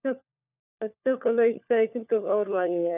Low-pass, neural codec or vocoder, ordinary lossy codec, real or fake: 3.6 kHz; codec, 16 kHz, 4 kbps, FunCodec, trained on LibriTTS, 50 frames a second; none; fake